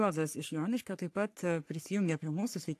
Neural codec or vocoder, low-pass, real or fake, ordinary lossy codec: codec, 44.1 kHz, 3.4 kbps, Pupu-Codec; 14.4 kHz; fake; AAC, 64 kbps